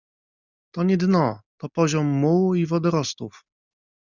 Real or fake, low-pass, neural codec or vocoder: real; 7.2 kHz; none